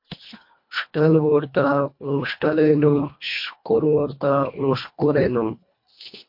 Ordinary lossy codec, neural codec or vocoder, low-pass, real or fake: MP3, 32 kbps; codec, 24 kHz, 1.5 kbps, HILCodec; 5.4 kHz; fake